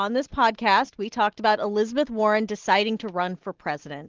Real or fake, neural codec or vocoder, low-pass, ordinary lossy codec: real; none; 7.2 kHz; Opus, 16 kbps